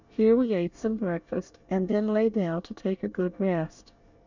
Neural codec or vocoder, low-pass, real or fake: codec, 24 kHz, 1 kbps, SNAC; 7.2 kHz; fake